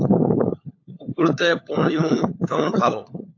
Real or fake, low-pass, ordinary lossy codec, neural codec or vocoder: fake; 7.2 kHz; AAC, 48 kbps; codec, 16 kHz, 4 kbps, FunCodec, trained on LibriTTS, 50 frames a second